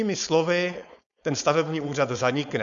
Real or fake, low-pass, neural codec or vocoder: fake; 7.2 kHz; codec, 16 kHz, 4.8 kbps, FACodec